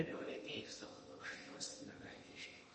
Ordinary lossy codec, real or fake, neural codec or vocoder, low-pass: MP3, 32 kbps; fake; codec, 16 kHz in and 24 kHz out, 0.8 kbps, FocalCodec, streaming, 65536 codes; 10.8 kHz